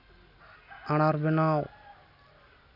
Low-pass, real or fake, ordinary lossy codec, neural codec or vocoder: 5.4 kHz; real; none; none